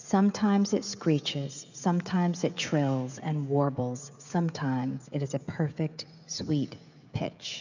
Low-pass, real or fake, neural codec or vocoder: 7.2 kHz; fake; codec, 16 kHz, 16 kbps, FreqCodec, smaller model